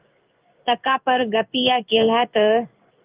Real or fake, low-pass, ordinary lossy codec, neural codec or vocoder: fake; 3.6 kHz; Opus, 24 kbps; codec, 16 kHz in and 24 kHz out, 1 kbps, XY-Tokenizer